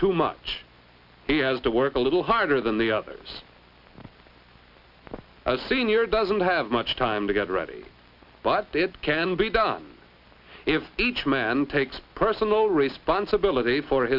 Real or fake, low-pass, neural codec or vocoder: real; 5.4 kHz; none